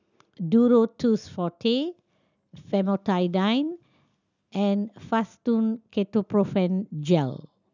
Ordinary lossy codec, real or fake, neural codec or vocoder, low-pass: none; real; none; 7.2 kHz